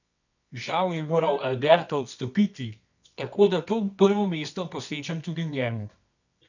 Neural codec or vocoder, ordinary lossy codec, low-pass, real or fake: codec, 24 kHz, 0.9 kbps, WavTokenizer, medium music audio release; none; 7.2 kHz; fake